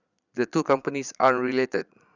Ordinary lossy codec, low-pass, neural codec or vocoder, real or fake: none; 7.2 kHz; vocoder, 22.05 kHz, 80 mel bands, Vocos; fake